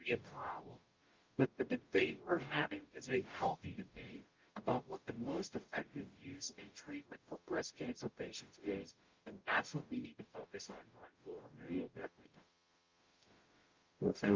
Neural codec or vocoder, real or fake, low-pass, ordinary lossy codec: codec, 44.1 kHz, 0.9 kbps, DAC; fake; 7.2 kHz; Opus, 24 kbps